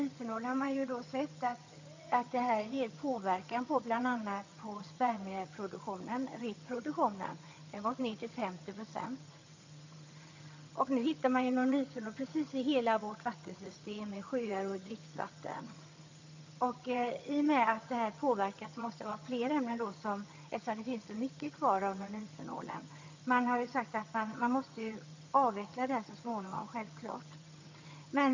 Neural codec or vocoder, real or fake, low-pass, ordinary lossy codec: vocoder, 22.05 kHz, 80 mel bands, HiFi-GAN; fake; 7.2 kHz; AAC, 48 kbps